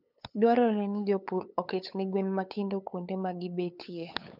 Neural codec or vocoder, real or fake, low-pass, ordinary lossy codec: codec, 16 kHz, 8 kbps, FunCodec, trained on LibriTTS, 25 frames a second; fake; 5.4 kHz; none